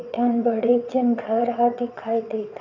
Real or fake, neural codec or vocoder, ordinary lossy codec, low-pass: fake; vocoder, 22.05 kHz, 80 mel bands, WaveNeXt; none; 7.2 kHz